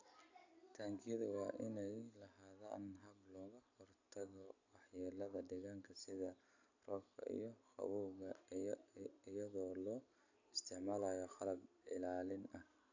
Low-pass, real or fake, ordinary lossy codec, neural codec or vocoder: 7.2 kHz; real; AAC, 48 kbps; none